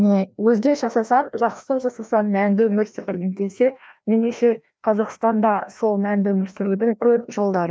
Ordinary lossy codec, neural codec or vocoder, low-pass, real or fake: none; codec, 16 kHz, 1 kbps, FreqCodec, larger model; none; fake